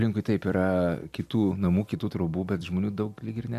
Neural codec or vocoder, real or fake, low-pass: none; real; 14.4 kHz